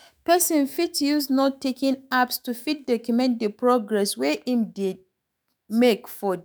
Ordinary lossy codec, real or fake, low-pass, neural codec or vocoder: none; fake; none; autoencoder, 48 kHz, 128 numbers a frame, DAC-VAE, trained on Japanese speech